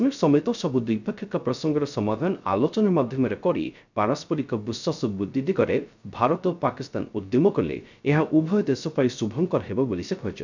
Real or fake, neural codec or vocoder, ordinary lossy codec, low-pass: fake; codec, 16 kHz, 0.3 kbps, FocalCodec; none; 7.2 kHz